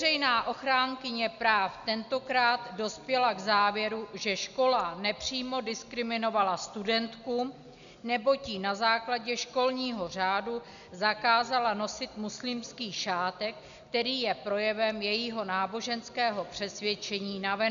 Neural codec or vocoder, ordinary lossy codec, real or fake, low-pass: none; MP3, 96 kbps; real; 7.2 kHz